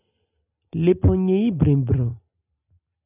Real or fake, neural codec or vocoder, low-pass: real; none; 3.6 kHz